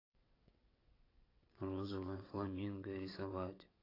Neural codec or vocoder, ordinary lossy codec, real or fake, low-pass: vocoder, 22.05 kHz, 80 mel bands, WaveNeXt; AAC, 24 kbps; fake; 5.4 kHz